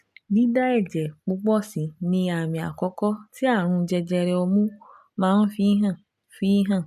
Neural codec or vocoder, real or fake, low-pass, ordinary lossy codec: none; real; 14.4 kHz; MP3, 96 kbps